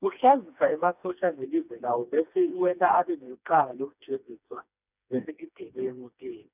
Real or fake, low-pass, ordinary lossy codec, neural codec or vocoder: fake; 3.6 kHz; none; codec, 16 kHz, 2 kbps, FreqCodec, smaller model